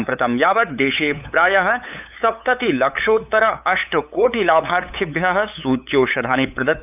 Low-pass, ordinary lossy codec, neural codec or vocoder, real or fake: 3.6 kHz; none; codec, 16 kHz, 8 kbps, FunCodec, trained on LibriTTS, 25 frames a second; fake